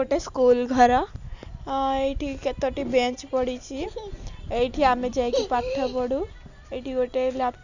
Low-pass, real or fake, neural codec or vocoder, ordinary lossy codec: 7.2 kHz; real; none; none